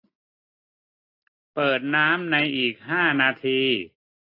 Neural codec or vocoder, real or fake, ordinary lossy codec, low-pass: none; real; AAC, 32 kbps; 5.4 kHz